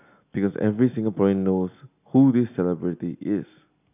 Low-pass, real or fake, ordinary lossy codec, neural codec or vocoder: 3.6 kHz; real; none; none